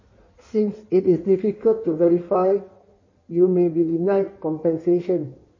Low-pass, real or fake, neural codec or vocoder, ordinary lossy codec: 7.2 kHz; fake; codec, 16 kHz in and 24 kHz out, 1.1 kbps, FireRedTTS-2 codec; MP3, 32 kbps